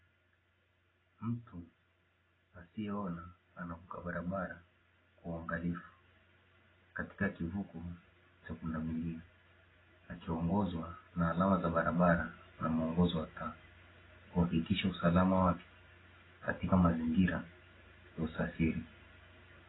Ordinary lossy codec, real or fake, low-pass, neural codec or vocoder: AAC, 16 kbps; real; 7.2 kHz; none